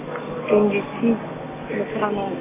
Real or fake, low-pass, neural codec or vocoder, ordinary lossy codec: real; 3.6 kHz; none; none